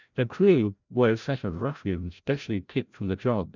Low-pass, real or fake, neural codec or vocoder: 7.2 kHz; fake; codec, 16 kHz, 0.5 kbps, FreqCodec, larger model